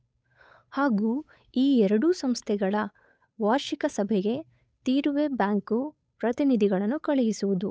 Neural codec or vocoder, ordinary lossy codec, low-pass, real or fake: codec, 16 kHz, 8 kbps, FunCodec, trained on Chinese and English, 25 frames a second; none; none; fake